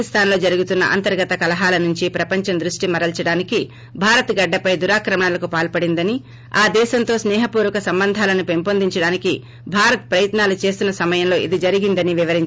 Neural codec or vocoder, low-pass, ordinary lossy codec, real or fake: none; none; none; real